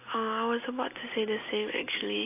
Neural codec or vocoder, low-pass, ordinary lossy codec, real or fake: none; 3.6 kHz; AAC, 24 kbps; real